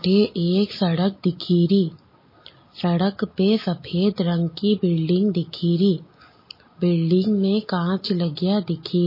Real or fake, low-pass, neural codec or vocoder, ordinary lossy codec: real; 5.4 kHz; none; MP3, 24 kbps